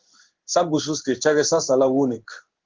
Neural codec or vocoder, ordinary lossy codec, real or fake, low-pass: codec, 16 kHz in and 24 kHz out, 1 kbps, XY-Tokenizer; Opus, 16 kbps; fake; 7.2 kHz